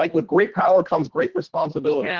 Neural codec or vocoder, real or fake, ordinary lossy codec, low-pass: codec, 24 kHz, 1.5 kbps, HILCodec; fake; Opus, 16 kbps; 7.2 kHz